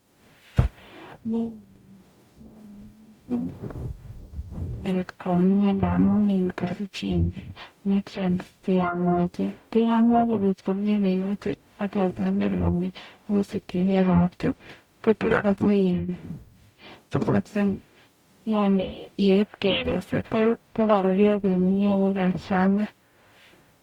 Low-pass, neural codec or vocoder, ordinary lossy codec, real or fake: 19.8 kHz; codec, 44.1 kHz, 0.9 kbps, DAC; Opus, 64 kbps; fake